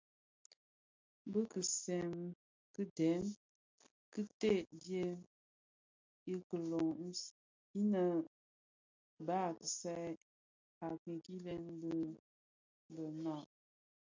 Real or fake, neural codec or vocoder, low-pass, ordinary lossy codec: real; none; 7.2 kHz; MP3, 64 kbps